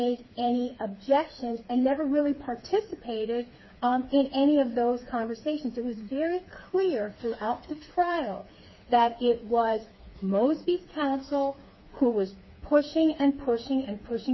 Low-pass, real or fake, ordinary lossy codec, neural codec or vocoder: 7.2 kHz; fake; MP3, 24 kbps; codec, 16 kHz, 4 kbps, FreqCodec, smaller model